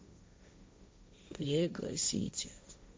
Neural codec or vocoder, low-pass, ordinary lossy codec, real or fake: codec, 16 kHz, 1.1 kbps, Voila-Tokenizer; none; none; fake